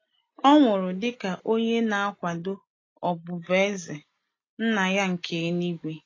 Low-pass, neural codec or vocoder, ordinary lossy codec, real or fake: 7.2 kHz; none; AAC, 32 kbps; real